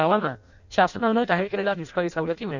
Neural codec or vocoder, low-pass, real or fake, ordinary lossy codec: codec, 16 kHz in and 24 kHz out, 0.6 kbps, FireRedTTS-2 codec; 7.2 kHz; fake; none